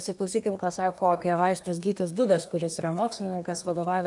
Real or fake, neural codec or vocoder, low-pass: fake; codec, 24 kHz, 1 kbps, SNAC; 10.8 kHz